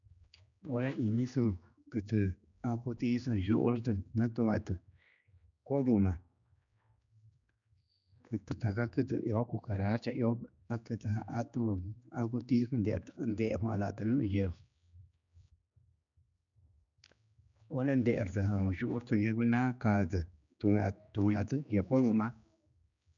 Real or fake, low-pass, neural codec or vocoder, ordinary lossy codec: fake; 7.2 kHz; codec, 16 kHz, 2 kbps, X-Codec, HuBERT features, trained on general audio; none